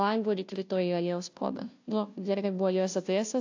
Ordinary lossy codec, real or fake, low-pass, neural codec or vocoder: AAC, 48 kbps; fake; 7.2 kHz; codec, 16 kHz, 0.5 kbps, FunCodec, trained on Chinese and English, 25 frames a second